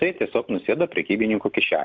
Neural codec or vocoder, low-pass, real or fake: none; 7.2 kHz; real